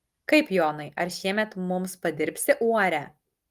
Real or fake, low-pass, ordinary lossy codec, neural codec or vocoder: real; 14.4 kHz; Opus, 24 kbps; none